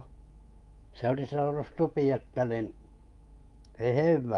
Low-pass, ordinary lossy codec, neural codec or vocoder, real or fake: 10.8 kHz; Opus, 32 kbps; codec, 24 kHz, 3.1 kbps, DualCodec; fake